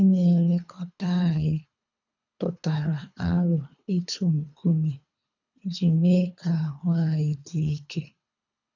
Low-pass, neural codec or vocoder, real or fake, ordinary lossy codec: 7.2 kHz; codec, 24 kHz, 3 kbps, HILCodec; fake; none